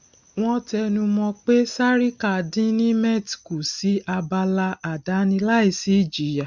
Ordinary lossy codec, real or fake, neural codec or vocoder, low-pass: none; real; none; 7.2 kHz